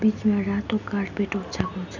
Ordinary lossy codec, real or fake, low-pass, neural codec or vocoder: none; real; 7.2 kHz; none